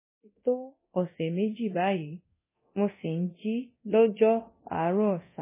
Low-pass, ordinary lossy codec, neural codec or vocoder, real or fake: 3.6 kHz; MP3, 16 kbps; codec, 24 kHz, 0.9 kbps, DualCodec; fake